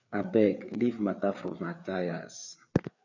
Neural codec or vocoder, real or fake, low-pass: codec, 16 kHz, 4 kbps, FreqCodec, larger model; fake; 7.2 kHz